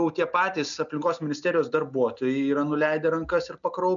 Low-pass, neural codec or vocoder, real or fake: 7.2 kHz; none; real